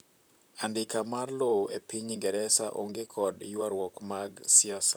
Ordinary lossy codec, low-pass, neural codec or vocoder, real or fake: none; none; vocoder, 44.1 kHz, 128 mel bands, Pupu-Vocoder; fake